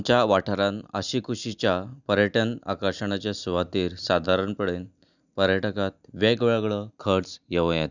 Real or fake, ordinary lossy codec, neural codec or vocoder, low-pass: real; none; none; 7.2 kHz